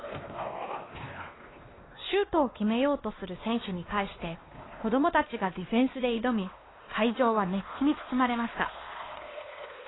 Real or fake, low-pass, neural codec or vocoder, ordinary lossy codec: fake; 7.2 kHz; codec, 16 kHz, 2 kbps, X-Codec, WavLM features, trained on Multilingual LibriSpeech; AAC, 16 kbps